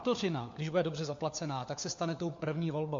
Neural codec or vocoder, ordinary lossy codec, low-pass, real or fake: codec, 16 kHz, 4 kbps, FunCodec, trained on LibriTTS, 50 frames a second; MP3, 48 kbps; 7.2 kHz; fake